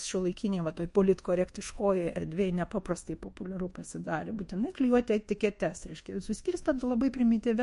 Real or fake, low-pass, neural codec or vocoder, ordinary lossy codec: fake; 10.8 kHz; codec, 24 kHz, 1.2 kbps, DualCodec; MP3, 48 kbps